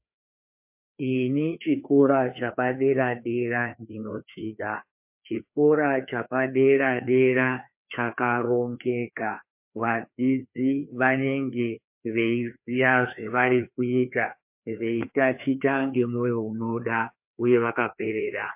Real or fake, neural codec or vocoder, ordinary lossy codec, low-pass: fake; codec, 16 kHz, 2 kbps, FreqCodec, larger model; MP3, 32 kbps; 3.6 kHz